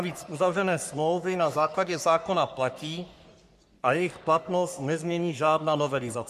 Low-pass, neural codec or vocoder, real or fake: 14.4 kHz; codec, 44.1 kHz, 3.4 kbps, Pupu-Codec; fake